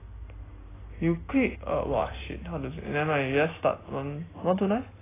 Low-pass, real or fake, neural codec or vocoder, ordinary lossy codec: 3.6 kHz; real; none; AAC, 16 kbps